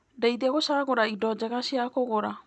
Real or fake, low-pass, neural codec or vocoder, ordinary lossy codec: real; none; none; none